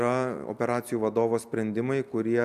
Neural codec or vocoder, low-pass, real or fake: none; 14.4 kHz; real